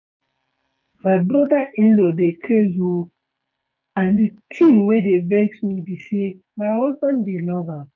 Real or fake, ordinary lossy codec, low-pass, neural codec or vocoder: fake; none; 7.2 kHz; codec, 44.1 kHz, 2.6 kbps, SNAC